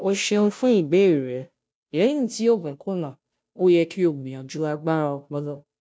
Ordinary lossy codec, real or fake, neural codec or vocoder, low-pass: none; fake; codec, 16 kHz, 0.5 kbps, FunCodec, trained on Chinese and English, 25 frames a second; none